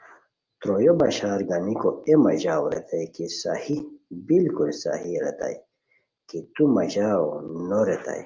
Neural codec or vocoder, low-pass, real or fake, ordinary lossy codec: none; 7.2 kHz; real; Opus, 24 kbps